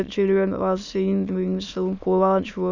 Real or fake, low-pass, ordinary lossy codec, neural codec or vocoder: fake; 7.2 kHz; none; autoencoder, 22.05 kHz, a latent of 192 numbers a frame, VITS, trained on many speakers